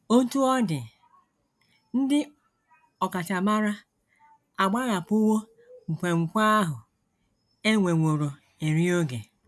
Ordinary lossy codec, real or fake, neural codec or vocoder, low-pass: none; real; none; none